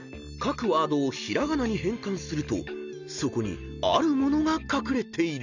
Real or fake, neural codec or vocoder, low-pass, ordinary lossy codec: real; none; 7.2 kHz; none